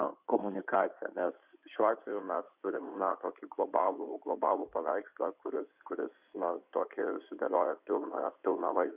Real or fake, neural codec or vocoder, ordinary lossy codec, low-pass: fake; codec, 16 kHz in and 24 kHz out, 2.2 kbps, FireRedTTS-2 codec; Opus, 64 kbps; 3.6 kHz